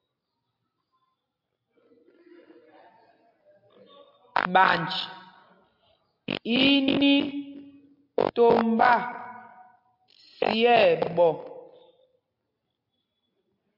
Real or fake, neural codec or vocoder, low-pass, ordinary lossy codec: real; none; 5.4 kHz; MP3, 48 kbps